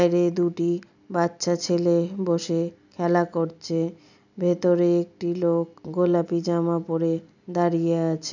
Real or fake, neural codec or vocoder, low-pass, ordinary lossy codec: real; none; 7.2 kHz; none